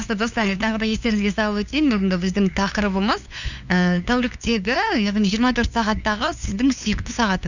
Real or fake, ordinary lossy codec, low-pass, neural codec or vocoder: fake; none; 7.2 kHz; codec, 16 kHz, 2 kbps, FunCodec, trained on LibriTTS, 25 frames a second